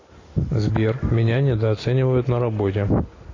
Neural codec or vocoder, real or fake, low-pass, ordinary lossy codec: none; real; 7.2 kHz; AAC, 32 kbps